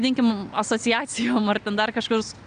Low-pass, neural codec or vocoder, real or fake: 9.9 kHz; none; real